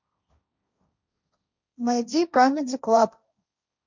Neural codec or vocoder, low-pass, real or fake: codec, 16 kHz, 1.1 kbps, Voila-Tokenizer; 7.2 kHz; fake